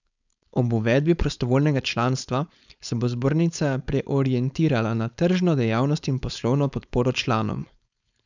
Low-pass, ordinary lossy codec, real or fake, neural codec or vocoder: 7.2 kHz; none; fake; codec, 16 kHz, 4.8 kbps, FACodec